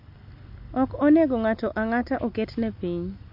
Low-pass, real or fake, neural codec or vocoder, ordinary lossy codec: 5.4 kHz; real; none; MP3, 32 kbps